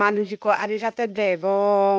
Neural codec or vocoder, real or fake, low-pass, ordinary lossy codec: codec, 16 kHz, 0.8 kbps, ZipCodec; fake; none; none